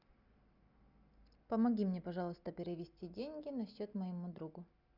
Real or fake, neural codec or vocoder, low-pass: real; none; 5.4 kHz